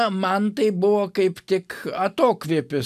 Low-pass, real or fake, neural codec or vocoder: 14.4 kHz; real; none